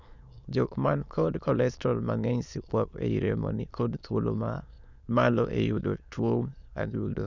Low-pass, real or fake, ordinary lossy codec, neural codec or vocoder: 7.2 kHz; fake; none; autoencoder, 22.05 kHz, a latent of 192 numbers a frame, VITS, trained on many speakers